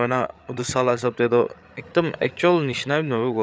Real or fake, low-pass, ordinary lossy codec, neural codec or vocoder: fake; none; none; codec, 16 kHz, 8 kbps, FreqCodec, larger model